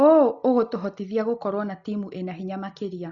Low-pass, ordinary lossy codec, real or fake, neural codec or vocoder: 7.2 kHz; Opus, 64 kbps; real; none